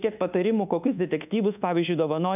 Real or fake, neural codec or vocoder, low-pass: fake; codec, 24 kHz, 1.2 kbps, DualCodec; 3.6 kHz